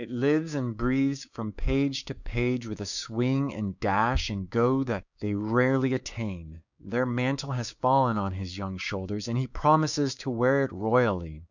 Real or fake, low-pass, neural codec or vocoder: fake; 7.2 kHz; codec, 16 kHz, 6 kbps, DAC